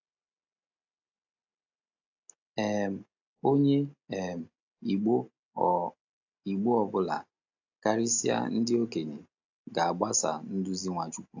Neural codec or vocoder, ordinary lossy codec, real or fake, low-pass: none; AAC, 48 kbps; real; 7.2 kHz